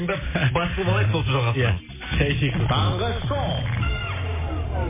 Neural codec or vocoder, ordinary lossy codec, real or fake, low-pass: none; MP3, 16 kbps; real; 3.6 kHz